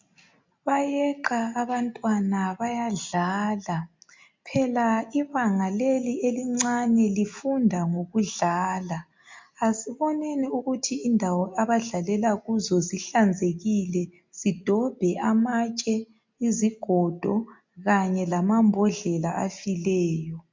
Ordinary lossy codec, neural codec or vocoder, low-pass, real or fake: MP3, 64 kbps; none; 7.2 kHz; real